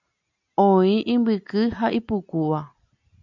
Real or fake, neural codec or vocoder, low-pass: real; none; 7.2 kHz